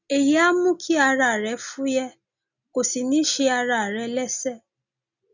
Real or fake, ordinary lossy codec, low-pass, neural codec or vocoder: real; none; 7.2 kHz; none